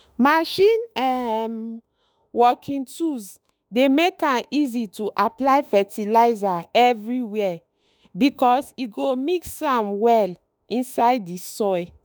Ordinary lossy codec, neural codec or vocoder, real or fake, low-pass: none; autoencoder, 48 kHz, 32 numbers a frame, DAC-VAE, trained on Japanese speech; fake; none